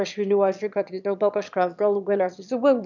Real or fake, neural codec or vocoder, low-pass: fake; autoencoder, 22.05 kHz, a latent of 192 numbers a frame, VITS, trained on one speaker; 7.2 kHz